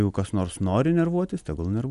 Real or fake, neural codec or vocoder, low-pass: real; none; 10.8 kHz